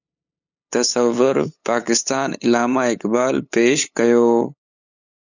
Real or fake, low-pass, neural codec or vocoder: fake; 7.2 kHz; codec, 16 kHz, 8 kbps, FunCodec, trained on LibriTTS, 25 frames a second